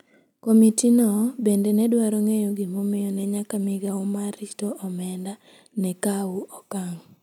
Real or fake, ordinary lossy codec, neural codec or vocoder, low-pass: real; none; none; 19.8 kHz